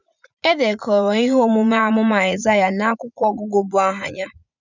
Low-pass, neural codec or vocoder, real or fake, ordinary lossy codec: 7.2 kHz; vocoder, 44.1 kHz, 80 mel bands, Vocos; fake; none